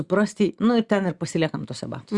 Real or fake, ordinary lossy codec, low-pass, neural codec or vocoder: real; Opus, 64 kbps; 10.8 kHz; none